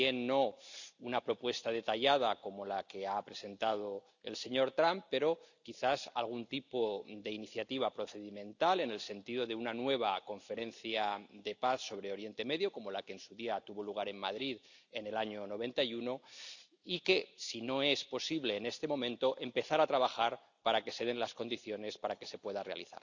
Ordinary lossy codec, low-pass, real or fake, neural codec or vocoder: none; 7.2 kHz; real; none